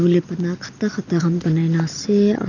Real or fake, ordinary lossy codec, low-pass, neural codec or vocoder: real; none; 7.2 kHz; none